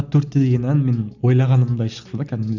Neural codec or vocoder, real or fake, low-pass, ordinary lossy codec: none; real; 7.2 kHz; none